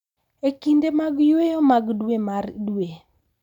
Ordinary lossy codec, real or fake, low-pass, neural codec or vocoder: none; real; 19.8 kHz; none